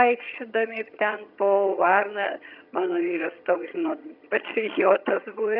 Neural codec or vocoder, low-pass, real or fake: vocoder, 22.05 kHz, 80 mel bands, HiFi-GAN; 5.4 kHz; fake